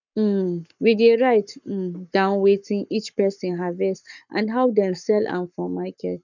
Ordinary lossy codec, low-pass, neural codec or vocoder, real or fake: none; 7.2 kHz; codec, 44.1 kHz, 7.8 kbps, Pupu-Codec; fake